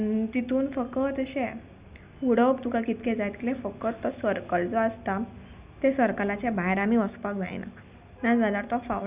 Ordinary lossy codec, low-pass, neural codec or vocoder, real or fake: Opus, 64 kbps; 3.6 kHz; none; real